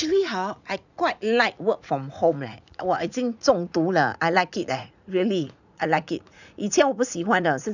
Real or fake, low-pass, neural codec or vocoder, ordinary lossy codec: fake; 7.2 kHz; vocoder, 44.1 kHz, 80 mel bands, Vocos; none